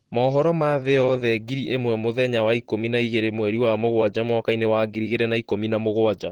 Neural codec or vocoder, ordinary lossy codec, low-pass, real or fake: vocoder, 44.1 kHz, 128 mel bands, Pupu-Vocoder; Opus, 16 kbps; 19.8 kHz; fake